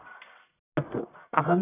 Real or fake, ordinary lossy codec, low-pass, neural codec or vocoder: fake; none; 3.6 kHz; codec, 44.1 kHz, 1.7 kbps, Pupu-Codec